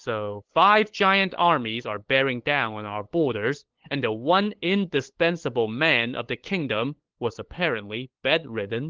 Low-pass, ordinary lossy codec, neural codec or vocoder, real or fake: 7.2 kHz; Opus, 16 kbps; codec, 16 kHz, 8 kbps, FunCodec, trained on LibriTTS, 25 frames a second; fake